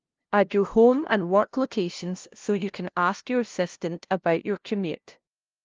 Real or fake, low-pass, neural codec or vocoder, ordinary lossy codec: fake; 7.2 kHz; codec, 16 kHz, 0.5 kbps, FunCodec, trained on LibriTTS, 25 frames a second; Opus, 32 kbps